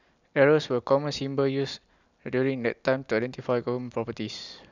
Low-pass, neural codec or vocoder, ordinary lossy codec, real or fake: 7.2 kHz; none; none; real